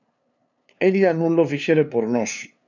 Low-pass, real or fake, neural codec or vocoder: 7.2 kHz; fake; codec, 16 kHz, 2 kbps, FunCodec, trained on LibriTTS, 25 frames a second